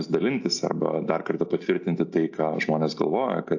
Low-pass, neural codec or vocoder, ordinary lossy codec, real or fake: 7.2 kHz; none; AAC, 48 kbps; real